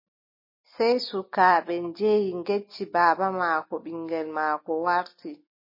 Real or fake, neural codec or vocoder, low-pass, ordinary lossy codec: real; none; 5.4 kHz; MP3, 24 kbps